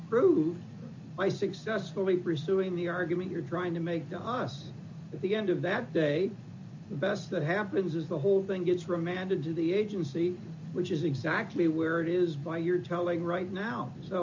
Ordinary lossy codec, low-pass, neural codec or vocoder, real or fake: MP3, 48 kbps; 7.2 kHz; none; real